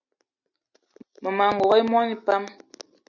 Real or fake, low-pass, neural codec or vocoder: real; 7.2 kHz; none